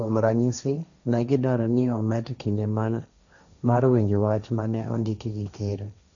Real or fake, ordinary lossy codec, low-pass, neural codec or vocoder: fake; none; 7.2 kHz; codec, 16 kHz, 1.1 kbps, Voila-Tokenizer